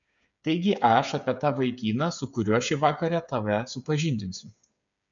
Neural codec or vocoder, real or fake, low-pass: codec, 16 kHz, 8 kbps, FreqCodec, smaller model; fake; 7.2 kHz